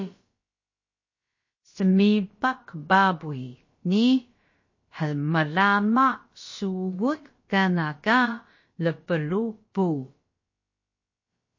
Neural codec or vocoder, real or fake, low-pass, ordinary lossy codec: codec, 16 kHz, about 1 kbps, DyCAST, with the encoder's durations; fake; 7.2 kHz; MP3, 32 kbps